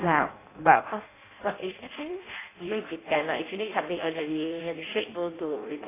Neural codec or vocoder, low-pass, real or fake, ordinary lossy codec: codec, 16 kHz in and 24 kHz out, 0.6 kbps, FireRedTTS-2 codec; 3.6 kHz; fake; AAC, 16 kbps